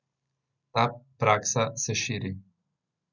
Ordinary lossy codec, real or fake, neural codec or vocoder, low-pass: none; real; none; 7.2 kHz